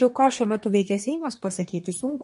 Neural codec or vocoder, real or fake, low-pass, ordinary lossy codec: codec, 24 kHz, 1 kbps, SNAC; fake; 10.8 kHz; MP3, 48 kbps